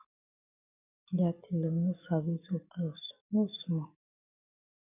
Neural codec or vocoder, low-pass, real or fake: codec, 44.1 kHz, 7.8 kbps, DAC; 3.6 kHz; fake